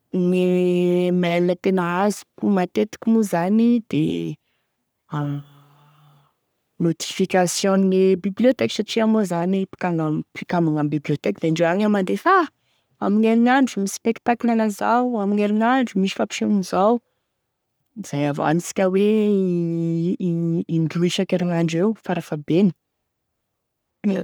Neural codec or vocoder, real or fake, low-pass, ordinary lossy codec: codec, 44.1 kHz, 3.4 kbps, Pupu-Codec; fake; none; none